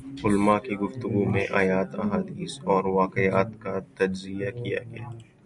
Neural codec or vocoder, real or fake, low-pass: none; real; 10.8 kHz